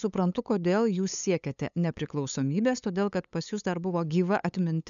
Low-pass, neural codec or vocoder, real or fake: 7.2 kHz; codec, 16 kHz, 8 kbps, FunCodec, trained on LibriTTS, 25 frames a second; fake